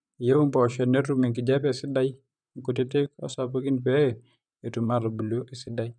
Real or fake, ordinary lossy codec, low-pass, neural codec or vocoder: fake; none; 9.9 kHz; vocoder, 44.1 kHz, 128 mel bands, Pupu-Vocoder